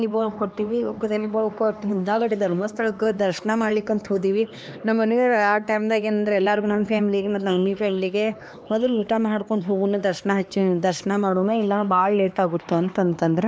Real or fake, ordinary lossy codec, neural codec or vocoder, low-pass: fake; none; codec, 16 kHz, 2 kbps, X-Codec, HuBERT features, trained on LibriSpeech; none